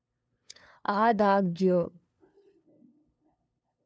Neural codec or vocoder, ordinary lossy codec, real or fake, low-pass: codec, 16 kHz, 2 kbps, FunCodec, trained on LibriTTS, 25 frames a second; none; fake; none